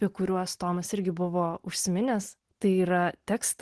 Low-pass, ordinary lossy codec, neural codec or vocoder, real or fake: 10.8 kHz; Opus, 16 kbps; none; real